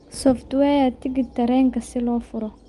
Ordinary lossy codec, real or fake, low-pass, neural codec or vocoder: Opus, 32 kbps; real; 10.8 kHz; none